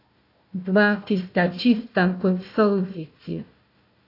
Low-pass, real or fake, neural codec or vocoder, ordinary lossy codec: 5.4 kHz; fake; codec, 16 kHz, 1 kbps, FunCodec, trained on Chinese and English, 50 frames a second; AAC, 32 kbps